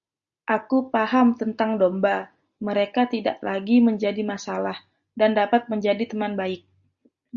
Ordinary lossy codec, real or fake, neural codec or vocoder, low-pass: Opus, 64 kbps; real; none; 7.2 kHz